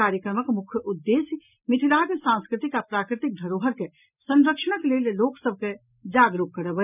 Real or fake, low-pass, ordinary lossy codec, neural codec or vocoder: real; 3.6 kHz; none; none